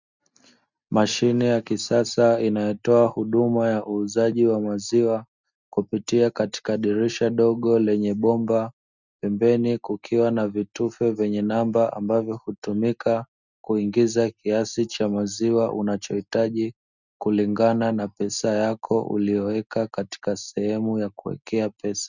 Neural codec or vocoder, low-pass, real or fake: none; 7.2 kHz; real